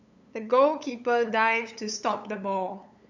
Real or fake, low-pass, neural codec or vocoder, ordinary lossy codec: fake; 7.2 kHz; codec, 16 kHz, 8 kbps, FunCodec, trained on LibriTTS, 25 frames a second; none